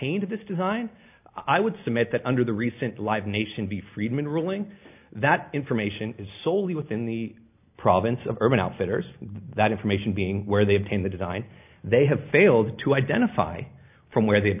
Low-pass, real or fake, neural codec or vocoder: 3.6 kHz; real; none